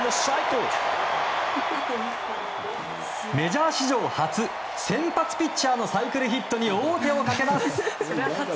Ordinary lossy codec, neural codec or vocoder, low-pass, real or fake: none; none; none; real